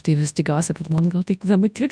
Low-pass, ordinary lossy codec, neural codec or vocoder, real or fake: 9.9 kHz; MP3, 96 kbps; codec, 24 kHz, 0.9 kbps, WavTokenizer, large speech release; fake